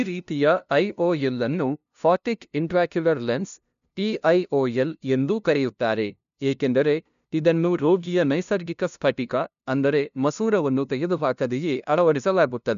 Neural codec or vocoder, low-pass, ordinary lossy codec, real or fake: codec, 16 kHz, 0.5 kbps, FunCodec, trained on LibriTTS, 25 frames a second; 7.2 kHz; none; fake